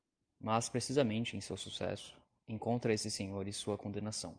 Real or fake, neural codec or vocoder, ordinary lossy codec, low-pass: real; none; Opus, 32 kbps; 9.9 kHz